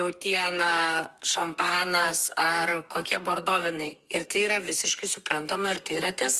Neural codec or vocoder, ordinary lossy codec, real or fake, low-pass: codec, 32 kHz, 1.9 kbps, SNAC; Opus, 32 kbps; fake; 14.4 kHz